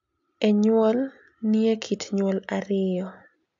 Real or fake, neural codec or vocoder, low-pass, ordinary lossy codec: real; none; 7.2 kHz; AAC, 64 kbps